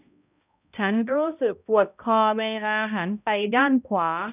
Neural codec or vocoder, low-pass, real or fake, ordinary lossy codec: codec, 16 kHz, 0.5 kbps, X-Codec, HuBERT features, trained on balanced general audio; 3.6 kHz; fake; none